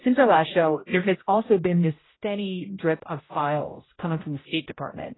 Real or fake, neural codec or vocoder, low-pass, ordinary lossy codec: fake; codec, 16 kHz, 0.5 kbps, X-Codec, HuBERT features, trained on general audio; 7.2 kHz; AAC, 16 kbps